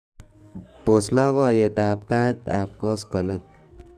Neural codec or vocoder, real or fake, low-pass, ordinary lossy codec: codec, 44.1 kHz, 2.6 kbps, SNAC; fake; 14.4 kHz; none